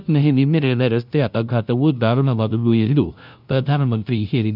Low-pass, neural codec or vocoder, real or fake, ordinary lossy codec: 5.4 kHz; codec, 16 kHz, 0.5 kbps, FunCodec, trained on LibriTTS, 25 frames a second; fake; none